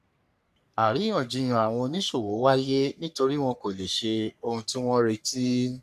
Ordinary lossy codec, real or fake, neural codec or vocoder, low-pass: none; fake; codec, 44.1 kHz, 3.4 kbps, Pupu-Codec; 14.4 kHz